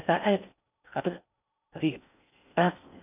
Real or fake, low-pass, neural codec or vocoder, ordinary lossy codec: fake; 3.6 kHz; codec, 16 kHz in and 24 kHz out, 0.6 kbps, FocalCodec, streaming, 4096 codes; none